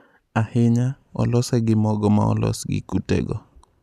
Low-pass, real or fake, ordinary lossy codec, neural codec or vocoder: 14.4 kHz; real; none; none